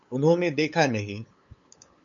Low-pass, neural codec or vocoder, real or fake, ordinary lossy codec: 7.2 kHz; codec, 16 kHz, 8 kbps, FunCodec, trained on LibriTTS, 25 frames a second; fake; MP3, 64 kbps